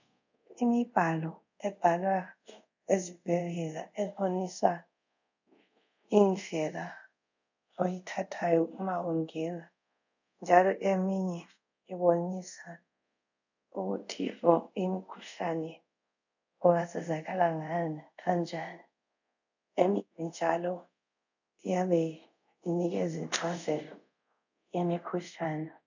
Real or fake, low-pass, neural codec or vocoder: fake; 7.2 kHz; codec, 24 kHz, 0.5 kbps, DualCodec